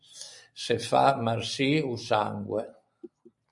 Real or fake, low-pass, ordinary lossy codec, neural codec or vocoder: real; 10.8 kHz; MP3, 96 kbps; none